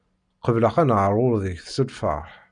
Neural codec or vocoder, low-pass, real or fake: none; 9.9 kHz; real